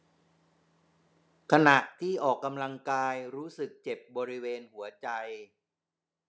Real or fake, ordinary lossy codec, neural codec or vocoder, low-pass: real; none; none; none